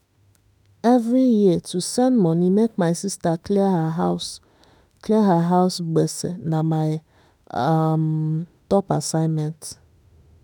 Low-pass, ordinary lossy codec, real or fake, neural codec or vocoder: none; none; fake; autoencoder, 48 kHz, 32 numbers a frame, DAC-VAE, trained on Japanese speech